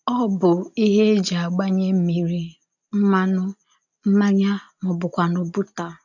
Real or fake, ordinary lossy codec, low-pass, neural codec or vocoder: real; none; 7.2 kHz; none